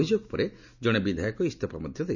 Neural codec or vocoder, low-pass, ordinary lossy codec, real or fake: none; 7.2 kHz; none; real